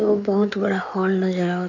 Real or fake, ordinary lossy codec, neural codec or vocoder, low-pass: fake; none; codec, 16 kHz in and 24 kHz out, 2.2 kbps, FireRedTTS-2 codec; 7.2 kHz